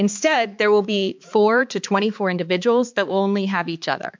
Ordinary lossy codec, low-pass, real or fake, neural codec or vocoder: MP3, 64 kbps; 7.2 kHz; fake; codec, 16 kHz, 4 kbps, X-Codec, HuBERT features, trained on balanced general audio